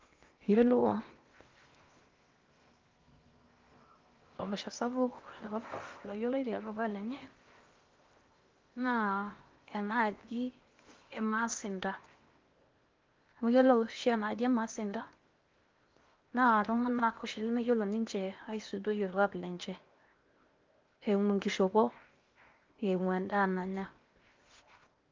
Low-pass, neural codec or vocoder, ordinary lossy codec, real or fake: 7.2 kHz; codec, 16 kHz in and 24 kHz out, 0.8 kbps, FocalCodec, streaming, 65536 codes; Opus, 32 kbps; fake